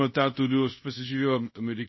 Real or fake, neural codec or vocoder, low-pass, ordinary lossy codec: fake; codec, 24 kHz, 0.5 kbps, DualCodec; 7.2 kHz; MP3, 24 kbps